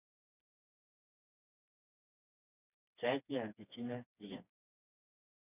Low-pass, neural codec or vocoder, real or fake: 3.6 kHz; codec, 16 kHz, 2 kbps, FreqCodec, smaller model; fake